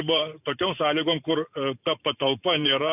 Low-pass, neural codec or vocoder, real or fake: 3.6 kHz; vocoder, 44.1 kHz, 128 mel bands every 512 samples, BigVGAN v2; fake